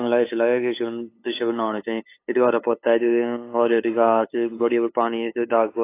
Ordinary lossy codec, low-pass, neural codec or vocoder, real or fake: AAC, 24 kbps; 3.6 kHz; codec, 16 kHz, 8 kbps, FunCodec, trained on LibriTTS, 25 frames a second; fake